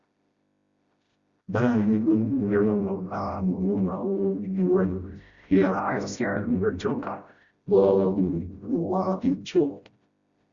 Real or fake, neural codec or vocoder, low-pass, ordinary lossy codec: fake; codec, 16 kHz, 0.5 kbps, FreqCodec, smaller model; 7.2 kHz; Opus, 64 kbps